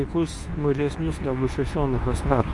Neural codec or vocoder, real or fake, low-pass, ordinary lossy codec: codec, 24 kHz, 0.9 kbps, WavTokenizer, medium speech release version 2; fake; 10.8 kHz; AAC, 64 kbps